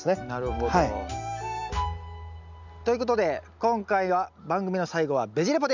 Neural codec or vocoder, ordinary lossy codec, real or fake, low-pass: none; none; real; 7.2 kHz